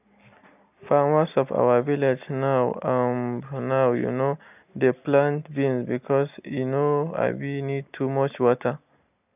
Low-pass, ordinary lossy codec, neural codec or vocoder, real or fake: 3.6 kHz; none; none; real